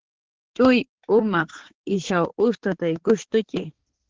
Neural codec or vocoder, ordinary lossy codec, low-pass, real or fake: codec, 16 kHz, 4 kbps, X-Codec, HuBERT features, trained on balanced general audio; Opus, 16 kbps; 7.2 kHz; fake